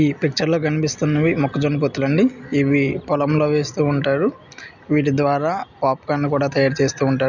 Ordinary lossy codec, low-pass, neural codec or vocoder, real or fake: none; 7.2 kHz; none; real